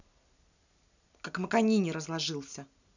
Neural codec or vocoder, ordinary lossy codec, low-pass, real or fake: none; none; 7.2 kHz; real